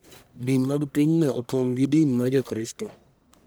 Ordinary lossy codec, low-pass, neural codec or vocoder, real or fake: none; none; codec, 44.1 kHz, 1.7 kbps, Pupu-Codec; fake